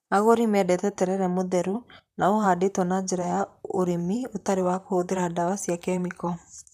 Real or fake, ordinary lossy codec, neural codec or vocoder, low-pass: fake; none; vocoder, 44.1 kHz, 128 mel bands, Pupu-Vocoder; 14.4 kHz